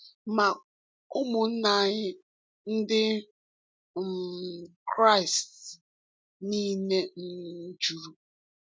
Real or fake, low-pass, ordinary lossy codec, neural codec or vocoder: real; none; none; none